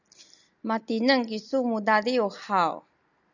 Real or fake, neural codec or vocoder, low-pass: real; none; 7.2 kHz